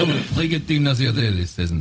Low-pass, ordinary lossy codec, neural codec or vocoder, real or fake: none; none; codec, 16 kHz, 0.4 kbps, LongCat-Audio-Codec; fake